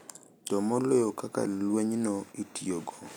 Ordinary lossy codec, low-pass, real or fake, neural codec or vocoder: none; none; real; none